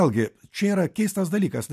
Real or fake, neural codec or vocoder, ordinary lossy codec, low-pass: real; none; MP3, 96 kbps; 14.4 kHz